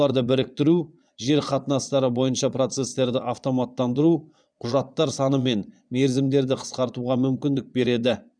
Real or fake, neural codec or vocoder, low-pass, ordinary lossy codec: fake; vocoder, 22.05 kHz, 80 mel bands, Vocos; 9.9 kHz; none